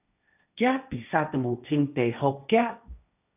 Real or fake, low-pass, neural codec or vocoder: fake; 3.6 kHz; codec, 16 kHz, 1.1 kbps, Voila-Tokenizer